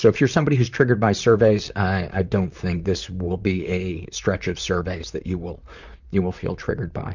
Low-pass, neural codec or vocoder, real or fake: 7.2 kHz; vocoder, 44.1 kHz, 128 mel bands, Pupu-Vocoder; fake